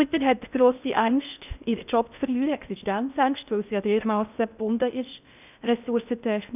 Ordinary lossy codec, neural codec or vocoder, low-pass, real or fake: none; codec, 16 kHz in and 24 kHz out, 0.6 kbps, FocalCodec, streaming, 4096 codes; 3.6 kHz; fake